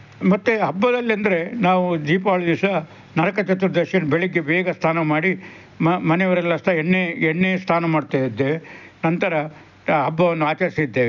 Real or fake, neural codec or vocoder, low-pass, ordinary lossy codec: real; none; 7.2 kHz; none